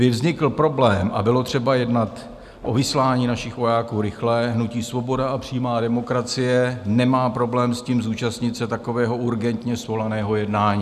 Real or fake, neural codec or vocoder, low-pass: real; none; 14.4 kHz